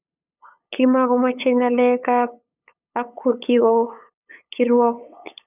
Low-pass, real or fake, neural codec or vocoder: 3.6 kHz; fake; codec, 16 kHz, 8 kbps, FunCodec, trained on LibriTTS, 25 frames a second